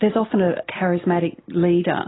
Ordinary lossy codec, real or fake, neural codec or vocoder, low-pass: AAC, 16 kbps; real; none; 7.2 kHz